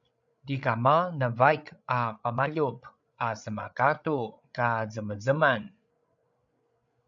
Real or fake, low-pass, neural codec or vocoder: fake; 7.2 kHz; codec, 16 kHz, 8 kbps, FreqCodec, larger model